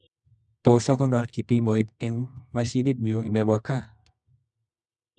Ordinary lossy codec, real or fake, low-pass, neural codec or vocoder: none; fake; none; codec, 24 kHz, 0.9 kbps, WavTokenizer, medium music audio release